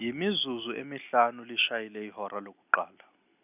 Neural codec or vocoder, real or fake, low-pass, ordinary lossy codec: none; real; 3.6 kHz; none